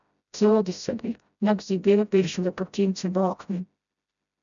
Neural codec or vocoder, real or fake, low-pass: codec, 16 kHz, 0.5 kbps, FreqCodec, smaller model; fake; 7.2 kHz